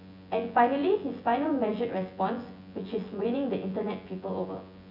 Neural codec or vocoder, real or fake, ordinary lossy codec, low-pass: vocoder, 24 kHz, 100 mel bands, Vocos; fake; none; 5.4 kHz